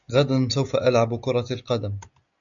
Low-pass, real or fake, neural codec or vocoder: 7.2 kHz; real; none